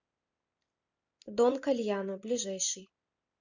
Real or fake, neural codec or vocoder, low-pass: fake; vocoder, 44.1 kHz, 128 mel bands every 256 samples, BigVGAN v2; 7.2 kHz